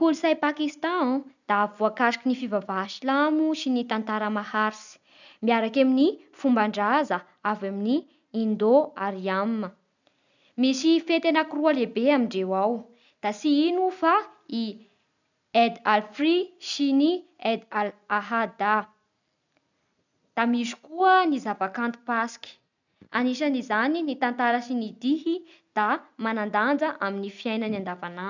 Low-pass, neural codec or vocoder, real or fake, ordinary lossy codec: 7.2 kHz; none; real; none